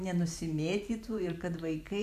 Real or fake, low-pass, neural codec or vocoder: fake; 14.4 kHz; vocoder, 44.1 kHz, 128 mel bands every 512 samples, BigVGAN v2